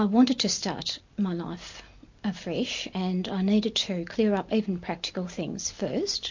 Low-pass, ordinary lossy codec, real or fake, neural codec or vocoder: 7.2 kHz; MP3, 48 kbps; real; none